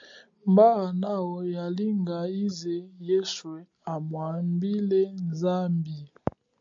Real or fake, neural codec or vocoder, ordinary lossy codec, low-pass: real; none; MP3, 48 kbps; 7.2 kHz